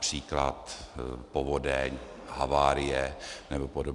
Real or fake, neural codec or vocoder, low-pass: real; none; 10.8 kHz